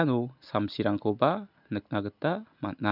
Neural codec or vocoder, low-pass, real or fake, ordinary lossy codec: none; 5.4 kHz; real; none